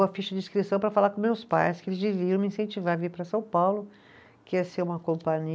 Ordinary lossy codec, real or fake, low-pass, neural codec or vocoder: none; real; none; none